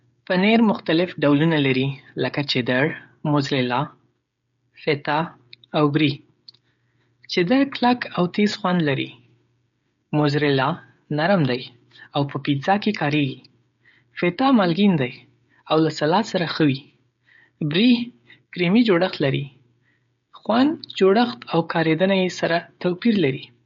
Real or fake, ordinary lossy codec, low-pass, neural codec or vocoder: fake; MP3, 48 kbps; 7.2 kHz; codec, 16 kHz, 16 kbps, FreqCodec, smaller model